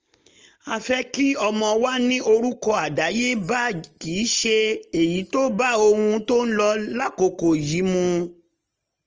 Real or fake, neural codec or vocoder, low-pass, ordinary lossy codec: real; none; 7.2 kHz; Opus, 24 kbps